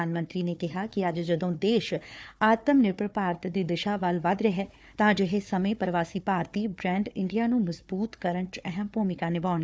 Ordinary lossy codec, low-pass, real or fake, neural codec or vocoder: none; none; fake; codec, 16 kHz, 4 kbps, FunCodec, trained on Chinese and English, 50 frames a second